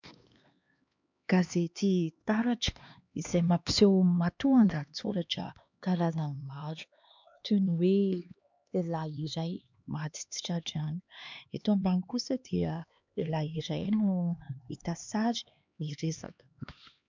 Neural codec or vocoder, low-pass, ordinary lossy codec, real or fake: codec, 16 kHz, 2 kbps, X-Codec, HuBERT features, trained on LibriSpeech; 7.2 kHz; MP3, 64 kbps; fake